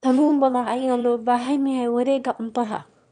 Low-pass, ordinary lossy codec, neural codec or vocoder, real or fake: 9.9 kHz; none; autoencoder, 22.05 kHz, a latent of 192 numbers a frame, VITS, trained on one speaker; fake